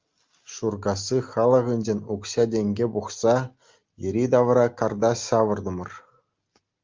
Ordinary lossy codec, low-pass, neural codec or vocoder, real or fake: Opus, 32 kbps; 7.2 kHz; none; real